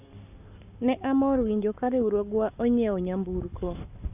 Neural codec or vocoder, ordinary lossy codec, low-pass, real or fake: codec, 44.1 kHz, 7.8 kbps, Pupu-Codec; none; 3.6 kHz; fake